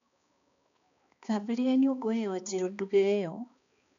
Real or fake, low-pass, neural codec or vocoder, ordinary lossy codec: fake; 7.2 kHz; codec, 16 kHz, 2 kbps, X-Codec, HuBERT features, trained on balanced general audio; none